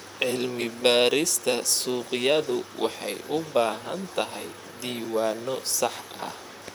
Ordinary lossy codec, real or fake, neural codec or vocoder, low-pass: none; fake; vocoder, 44.1 kHz, 128 mel bands, Pupu-Vocoder; none